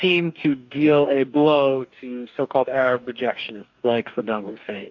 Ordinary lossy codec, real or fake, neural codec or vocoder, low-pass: AAC, 48 kbps; fake; codec, 44.1 kHz, 2.6 kbps, DAC; 7.2 kHz